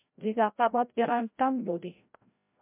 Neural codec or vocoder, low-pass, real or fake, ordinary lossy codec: codec, 16 kHz, 0.5 kbps, FreqCodec, larger model; 3.6 kHz; fake; MP3, 32 kbps